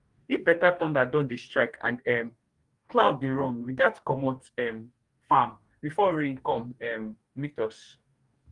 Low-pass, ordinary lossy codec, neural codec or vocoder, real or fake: 10.8 kHz; Opus, 32 kbps; codec, 44.1 kHz, 2.6 kbps, DAC; fake